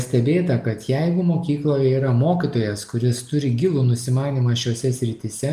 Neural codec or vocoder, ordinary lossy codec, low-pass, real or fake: none; Opus, 32 kbps; 14.4 kHz; real